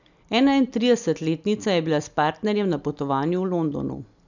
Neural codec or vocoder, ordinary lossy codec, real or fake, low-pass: none; none; real; 7.2 kHz